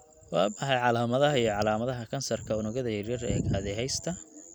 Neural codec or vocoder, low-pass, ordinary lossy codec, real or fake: none; 19.8 kHz; none; real